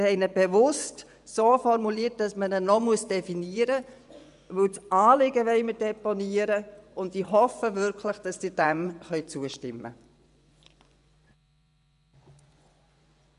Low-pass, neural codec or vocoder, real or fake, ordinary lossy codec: 10.8 kHz; vocoder, 24 kHz, 100 mel bands, Vocos; fake; none